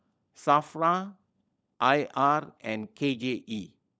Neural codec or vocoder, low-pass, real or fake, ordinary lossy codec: codec, 16 kHz, 16 kbps, FunCodec, trained on LibriTTS, 50 frames a second; none; fake; none